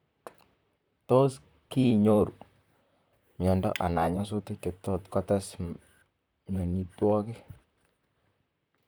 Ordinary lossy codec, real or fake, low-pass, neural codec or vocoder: none; fake; none; vocoder, 44.1 kHz, 128 mel bands, Pupu-Vocoder